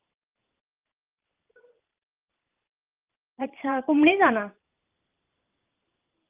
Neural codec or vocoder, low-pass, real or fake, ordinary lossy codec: vocoder, 44.1 kHz, 128 mel bands every 512 samples, BigVGAN v2; 3.6 kHz; fake; Opus, 24 kbps